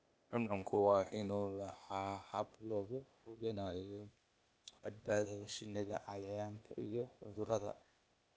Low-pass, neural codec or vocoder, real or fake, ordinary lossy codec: none; codec, 16 kHz, 0.8 kbps, ZipCodec; fake; none